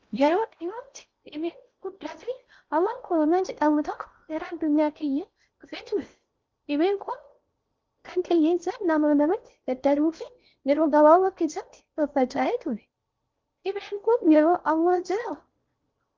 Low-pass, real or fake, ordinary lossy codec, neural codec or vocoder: 7.2 kHz; fake; Opus, 24 kbps; codec, 16 kHz in and 24 kHz out, 0.6 kbps, FocalCodec, streaming, 4096 codes